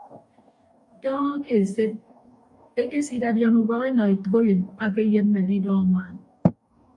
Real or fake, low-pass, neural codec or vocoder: fake; 10.8 kHz; codec, 44.1 kHz, 2.6 kbps, DAC